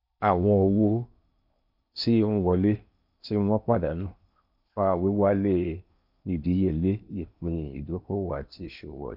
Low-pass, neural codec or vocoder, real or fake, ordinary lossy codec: 5.4 kHz; codec, 16 kHz in and 24 kHz out, 0.8 kbps, FocalCodec, streaming, 65536 codes; fake; none